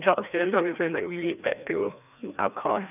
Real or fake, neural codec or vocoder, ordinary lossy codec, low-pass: fake; codec, 16 kHz, 1 kbps, FreqCodec, larger model; none; 3.6 kHz